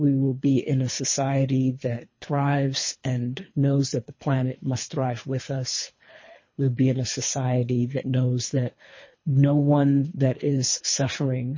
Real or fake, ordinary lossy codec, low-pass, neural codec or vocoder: fake; MP3, 32 kbps; 7.2 kHz; codec, 24 kHz, 3 kbps, HILCodec